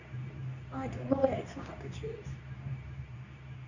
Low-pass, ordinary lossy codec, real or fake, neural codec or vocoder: 7.2 kHz; none; fake; codec, 24 kHz, 0.9 kbps, WavTokenizer, medium speech release version 2